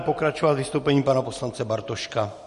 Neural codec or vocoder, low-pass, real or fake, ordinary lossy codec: none; 10.8 kHz; real; MP3, 48 kbps